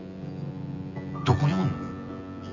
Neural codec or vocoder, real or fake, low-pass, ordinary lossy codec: vocoder, 24 kHz, 100 mel bands, Vocos; fake; 7.2 kHz; none